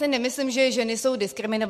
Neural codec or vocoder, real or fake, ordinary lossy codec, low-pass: none; real; MP3, 64 kbps; 14.4 kHz